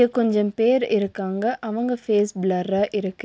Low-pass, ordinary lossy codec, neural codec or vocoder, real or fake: none; none; none; real